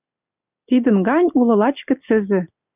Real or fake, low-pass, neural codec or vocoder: real; 3.6 kHz; none